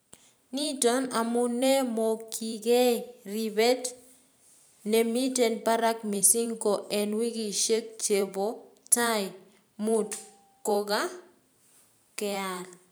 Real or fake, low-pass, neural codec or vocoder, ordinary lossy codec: fake; none; vocoder, 44.1 kHz, 128 mel bands every 512 samples, BigVGAN v2; none